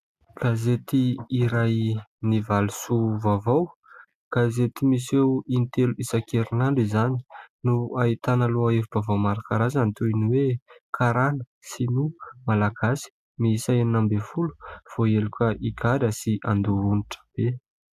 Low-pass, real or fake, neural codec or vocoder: 14.4 kHz; real; none